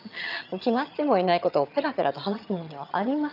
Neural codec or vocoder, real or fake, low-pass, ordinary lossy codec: vocoder, 22.05 kHz, 80 mel bands, HiFi-GAN; fake; 5.4 kHz; none